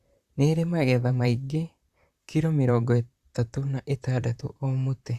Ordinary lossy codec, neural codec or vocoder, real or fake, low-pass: Opus, 64 kbps; vocoder, 44.1 kHz, 128 mel bands, Pupu-Vocoder; fake; 14.4 kHz